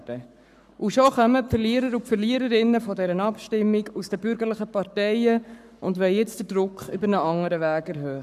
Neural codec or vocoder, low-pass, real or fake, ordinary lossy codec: codec, 44.1 kHz, 7.8 kbps, Pupu-Codec; 14.4 kHz; fake; none